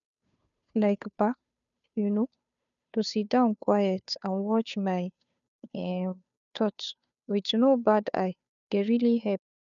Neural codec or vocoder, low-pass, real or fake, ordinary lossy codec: codec, 16 kHz, 2 kbps, FunCodec, trained on Chinese and English, 25 frames a second; 7.2 kHz; fake; none